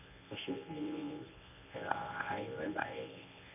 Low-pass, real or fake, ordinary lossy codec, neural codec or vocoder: 3.6 kHz; fake; none; codec, 24 kHz, 0.9 kbps, WavTokenizer, medium speech release version 1